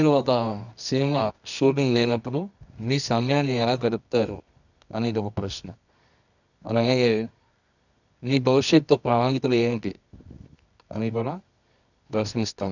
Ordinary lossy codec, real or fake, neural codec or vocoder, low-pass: none; fake; codec, 24 kHz, 0.9 kbps, WavTokenizer, medium music audio release; 7.2 kHz